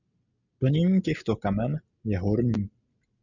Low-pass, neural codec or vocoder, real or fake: 7.2 kHz; none; real